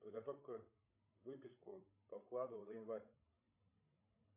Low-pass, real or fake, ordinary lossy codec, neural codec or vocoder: 3.6 kHz; fake; AAC, 24 kbps; codec, 16 kHz, 16 kbps, FreqCodec, larger model